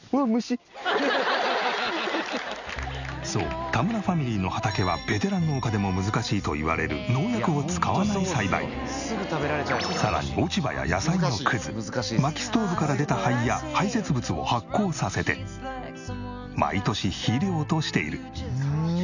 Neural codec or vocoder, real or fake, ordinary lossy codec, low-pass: none; real; none; 7.2 kHz